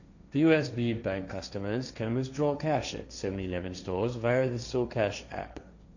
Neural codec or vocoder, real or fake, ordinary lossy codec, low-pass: codec, 16 kHz, 1.1 kbps, Voila-Tokenizer; fake; none; 7.2 kHz